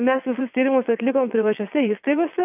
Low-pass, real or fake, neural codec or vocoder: 3.6 kHz; fake; vocoder, 22.05 kHz, 80 mel bands, WaveNeXt